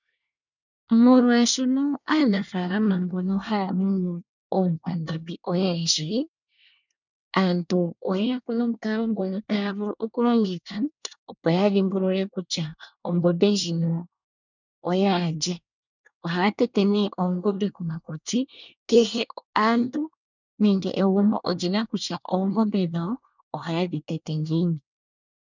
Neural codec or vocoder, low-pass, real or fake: codec, 24 kHz, 1 kbps, SNAC; 7.2 kHz; fake